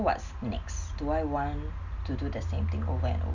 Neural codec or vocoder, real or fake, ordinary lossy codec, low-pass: none; real; none; 7.2 kHz